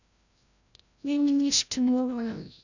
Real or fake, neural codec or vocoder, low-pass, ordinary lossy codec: fake; codec, 16 kHz, 0.5 kbps, FreqCodec, larger model; 7.2 kHz; none